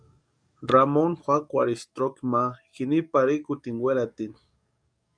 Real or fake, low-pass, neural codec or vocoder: fake; 9.9 kHz; codec, 44.1 kHz, 7.8 kbps, DAC